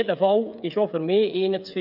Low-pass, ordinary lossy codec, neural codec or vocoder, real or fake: 5.4 kHz; none; codec, 16 kHz, 8 kbps, FreqCodec, smaller model; fake